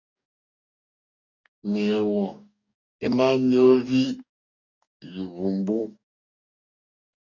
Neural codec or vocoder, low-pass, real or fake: codec, 44.1 kHz, 2.6 kbps, DAC; 7.2 kHz; fake